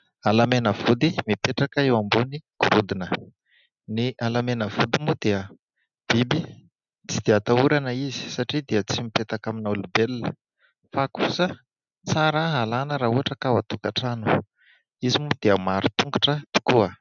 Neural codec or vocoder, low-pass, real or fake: none; 7.2 kHz; real